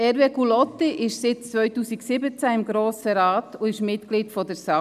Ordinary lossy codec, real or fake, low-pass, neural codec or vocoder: none; real; 14.4 kHz; none